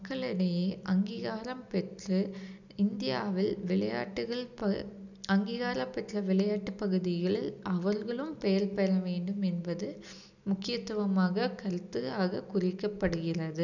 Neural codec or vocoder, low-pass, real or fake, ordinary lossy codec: none; 7.2 kHz; real; none